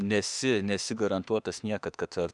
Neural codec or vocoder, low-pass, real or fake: autoencoder, 48 kHz, 32 numbers a frame, DAC-VAE, trained on Japanese speech; 10.8 kHz; fake